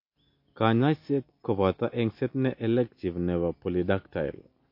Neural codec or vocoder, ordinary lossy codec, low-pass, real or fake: none; MP3, 32 kbps; 5.4 kHz; real